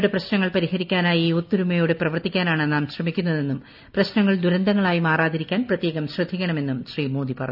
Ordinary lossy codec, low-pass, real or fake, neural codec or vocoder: none; 5.4 kHz; real; none